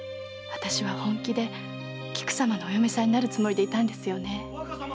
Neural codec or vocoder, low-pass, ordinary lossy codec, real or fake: none; none; none; real